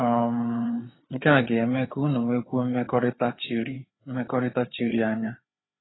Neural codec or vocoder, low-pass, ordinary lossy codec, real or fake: codec, 16 kHz, 4 kbps, FreqCodec, larger model; 7.2 kHz; AAC, 16 kbps; fake